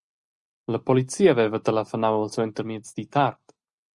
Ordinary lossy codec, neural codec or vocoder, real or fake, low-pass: Opus, 64 kbps; none; real; 10.8 kHz